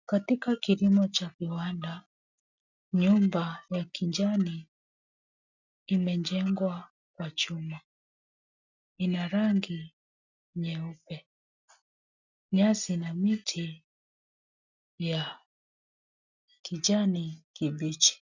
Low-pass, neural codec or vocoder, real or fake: 7.2 kHz; none; real